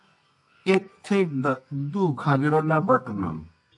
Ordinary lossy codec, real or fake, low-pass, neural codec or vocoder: AAC, 64 kbps; fake; 10.8 kHz; codec, 24 kHz, 0.9 kbps, WavTokenizer, medium music audio release